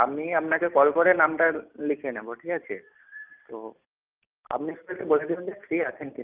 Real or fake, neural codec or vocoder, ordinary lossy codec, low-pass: real; none; Opus, 24 kbps; 3.6 kHz